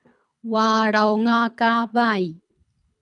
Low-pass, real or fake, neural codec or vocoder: 10.8 kHz; fake; codec, 24 kHz, 3 kbps, HILCodec